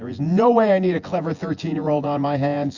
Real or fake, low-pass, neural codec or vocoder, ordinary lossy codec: fake; 7.2 kHz; vocoder, 24 kHz, 100 mel bands, Vocos; Opus, 64 kbps